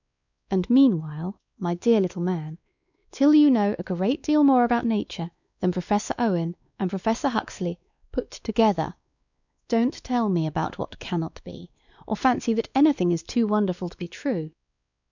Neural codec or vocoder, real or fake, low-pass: codec, 16 kHz, 2 kbps, X-Codec, WavLM features, trained on Multilingual LibriSpeech; fake; 7.2 kHz